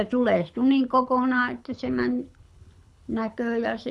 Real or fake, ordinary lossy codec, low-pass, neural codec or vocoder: fake; none; none; codec, 24 kHz, 6 kbps, HILCodec